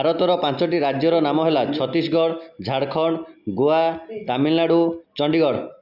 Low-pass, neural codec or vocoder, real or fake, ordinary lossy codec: 5.4 kHz; none; real; AAC, 48 kbps